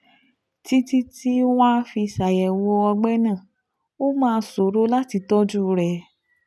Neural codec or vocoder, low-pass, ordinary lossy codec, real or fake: none; none; none; real